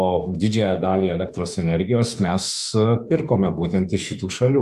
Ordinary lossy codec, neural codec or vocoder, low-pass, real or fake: AAC, 96 kbps; autoencoder, 48 kHz, 32 numbers a frame, DAC-VAE, trained on Japanese speech; 14.4 kHz; fake